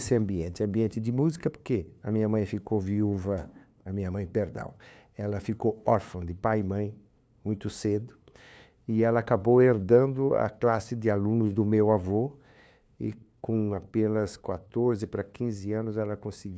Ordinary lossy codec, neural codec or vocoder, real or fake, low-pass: none; codec, 16 kHz, 2 kbps, FunCodec, trained on LibriTTS, 25 frames a second; fake; none